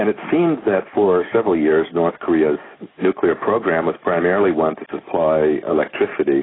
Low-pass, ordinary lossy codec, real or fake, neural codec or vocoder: 7.2 kHz; AAC, 16 kbps; real; none